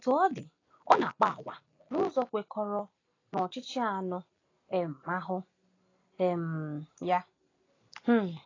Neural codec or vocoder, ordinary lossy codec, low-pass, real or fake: none; AAC, 32 kbps; 7.2 kHz; real